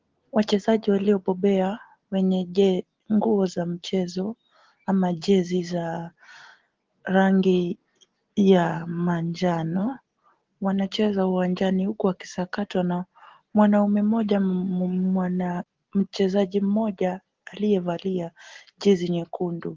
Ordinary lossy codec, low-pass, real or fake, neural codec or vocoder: Opus, 16 kbps; 7.2 kHz; real; none